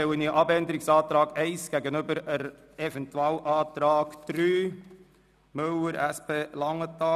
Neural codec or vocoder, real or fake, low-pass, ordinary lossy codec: none; real; 14.4 kHz; none